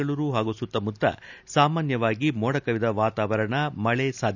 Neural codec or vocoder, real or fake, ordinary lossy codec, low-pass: none; real; none; 7.2 kHz